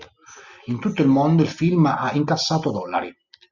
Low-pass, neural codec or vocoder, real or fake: 7.2 kHz; none; real